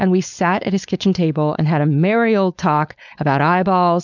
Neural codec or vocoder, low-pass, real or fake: codec, 16 kHz, 4.8 kbps, FACodec; 7.2 kHz; fake